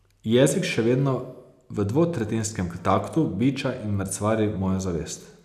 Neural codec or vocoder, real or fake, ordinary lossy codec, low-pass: none; real; none; 14.4 kHz